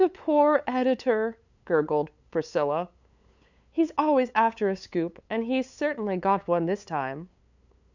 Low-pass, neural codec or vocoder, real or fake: 7.2 kHz; codec, 16 kHz, 8 kbps, FunCodec, trained on LibriTTS, 25 frames a second; fake